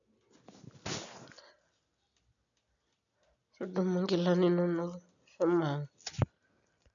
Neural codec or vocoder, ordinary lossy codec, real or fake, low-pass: none; none; real; 7.2 kHz